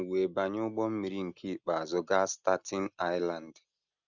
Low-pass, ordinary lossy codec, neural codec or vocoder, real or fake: 7.2 kHz; none; none; real